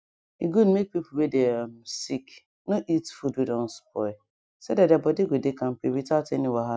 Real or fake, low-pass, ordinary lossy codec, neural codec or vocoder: real; none; none; none